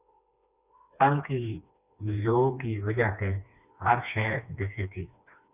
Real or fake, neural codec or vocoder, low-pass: fake; codec, 16 kHz, 2 kbps, FreqCodec, smaller model; 3.6 kHz